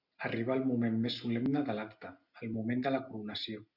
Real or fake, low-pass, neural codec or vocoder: real; 5.4 kHz; none